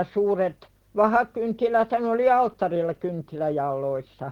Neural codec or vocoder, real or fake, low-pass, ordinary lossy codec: none; real; 19.8 kHz; Opus, 16 kbps